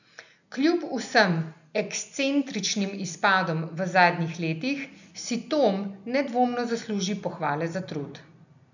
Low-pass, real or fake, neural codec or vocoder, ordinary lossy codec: 7.2 kHz; real; none; none